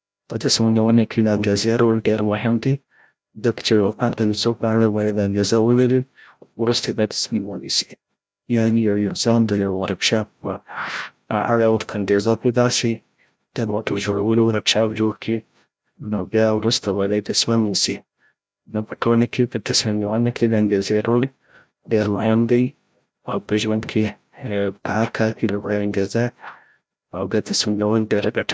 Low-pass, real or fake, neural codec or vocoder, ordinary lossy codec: none; fake; codec, 16 kHz, 0.5 kbps, FreqCodec, larger model; none